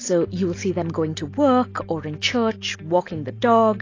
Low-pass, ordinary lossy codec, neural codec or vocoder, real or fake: 7.2 kHz; AAC, 32 kbps; none; real